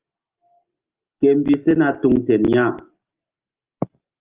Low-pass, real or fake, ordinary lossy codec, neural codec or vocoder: 3.6 kHz; real; Opus, 32 kbps; none